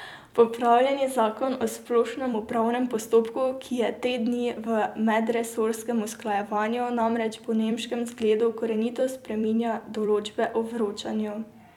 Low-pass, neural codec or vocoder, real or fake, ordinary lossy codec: 19.8 kHz; vocoder, 44.1 kHz, 128 mel bands every 256 samples, BigVGAN v2; fake; none